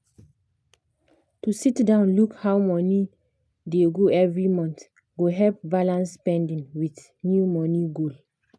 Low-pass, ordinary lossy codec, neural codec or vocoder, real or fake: none; none; none; real